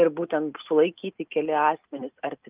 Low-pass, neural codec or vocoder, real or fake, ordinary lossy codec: 3.6 kHz; none; real; Opus, 32 kbps